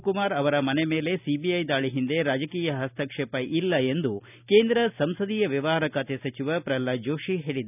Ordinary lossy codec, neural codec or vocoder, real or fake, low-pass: none; none; real; 3.6 kHz